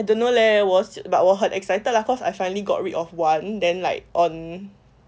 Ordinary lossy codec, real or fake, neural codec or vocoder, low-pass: none; real; none; none